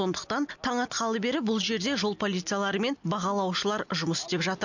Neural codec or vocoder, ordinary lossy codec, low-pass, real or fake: none; none; 7.2 kHz; real